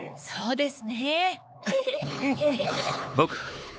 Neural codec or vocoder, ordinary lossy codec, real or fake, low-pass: codec, 16 kHz, 4 kbps, X-Codec, HuBERT features, trained on LibriSpeech; none; fake; none